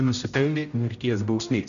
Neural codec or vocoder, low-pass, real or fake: codec, 16 kHz, 0.5 kbps, X-Codec, HuBERT features, trained on general audio; 7.2 kHz; fake